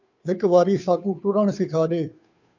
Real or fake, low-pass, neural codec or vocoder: fake; 7.2 kHz; codec, 16 kHz, 2 kbps, FunCodec, trained on Chinese and English, 25 frames a second